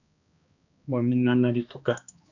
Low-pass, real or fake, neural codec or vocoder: 7.2 kHz; fake; codec, 16 kHz, 2 kbps, X-Codec, HuBERT features, trained on balanced general audio